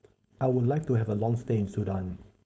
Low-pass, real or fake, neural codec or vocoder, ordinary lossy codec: none; fake; codec, 16 kHz, 4.8 kbps, FACodec; none